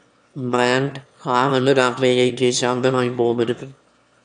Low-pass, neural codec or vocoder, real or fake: 9.9 kHz; autoencoder, 22.05 kHz, a latent of 192 numbers a frame, VITS, trained on one speaker; fake